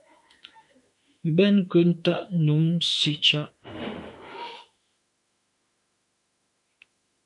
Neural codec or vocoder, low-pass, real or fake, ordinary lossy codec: autoencoder, 48 kHz, 32 numbers a frame, DAC-VAE, trained on Japanese speech; 10.8 kHz; fake; MP3, 64 kbps